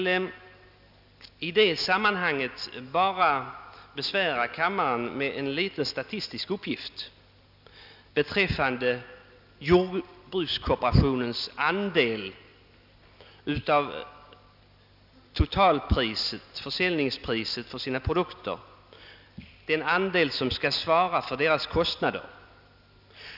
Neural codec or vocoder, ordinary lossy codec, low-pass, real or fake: none; none; 5.4 kHz; real